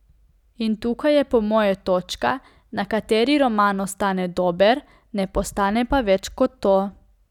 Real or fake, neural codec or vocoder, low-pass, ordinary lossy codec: real; none; 19.8 kHz; none